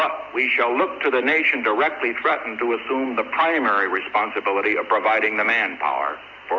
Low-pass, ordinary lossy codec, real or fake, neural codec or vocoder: 7.2 kHz; AAC, 48 kbps; real; none